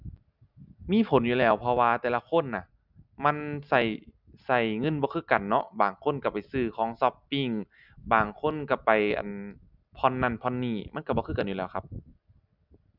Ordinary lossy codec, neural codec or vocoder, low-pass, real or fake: none; none; 5.4 kHz; real